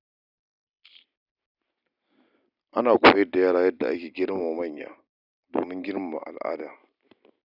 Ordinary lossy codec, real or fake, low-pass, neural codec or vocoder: none; real; 5.4 kHz; none